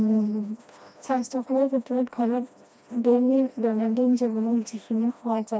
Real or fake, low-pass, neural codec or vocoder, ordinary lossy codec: fake; none; codec, 16 kHz, 1 kbps, FreqCodec, smaller model; none